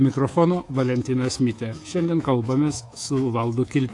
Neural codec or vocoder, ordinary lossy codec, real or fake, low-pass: codec, 24 kHz, 3.1 kbps, DualCodec; AAC, 48 kbps; fake; 10.8 kHz